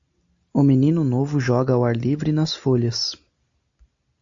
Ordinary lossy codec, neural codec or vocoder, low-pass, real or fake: AAC, 64 kbps; none; 7.2 kHz; real